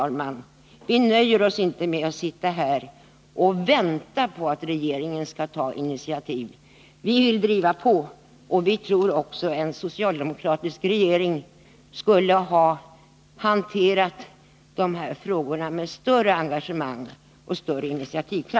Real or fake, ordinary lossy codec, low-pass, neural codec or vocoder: real; none; none; none